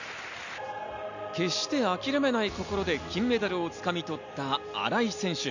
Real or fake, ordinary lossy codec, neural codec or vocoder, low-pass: real; none; none; 7.2 kHz